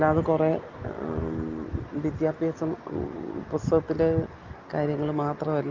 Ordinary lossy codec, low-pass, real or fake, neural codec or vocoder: Opus, 24 kbps; 7.2 kHz; real; none